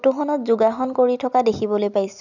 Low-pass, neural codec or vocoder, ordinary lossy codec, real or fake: 7.2 kHz; none; none; real